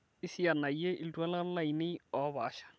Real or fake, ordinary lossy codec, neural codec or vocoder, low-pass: real; none; none; none